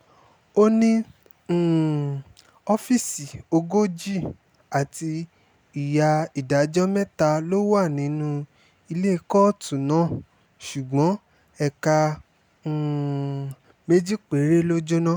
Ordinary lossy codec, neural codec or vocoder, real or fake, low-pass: none; none; real; none